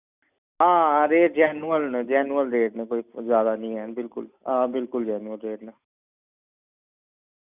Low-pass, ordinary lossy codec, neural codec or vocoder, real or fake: 3.6 kHz; none; none; real